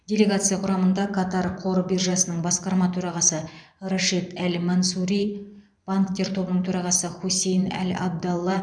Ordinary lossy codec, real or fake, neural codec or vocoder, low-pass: none; fake; vocoder, 44.1 kHz, 128 mel bands every 512 samples, BigVGAN v2; 9.9 kHz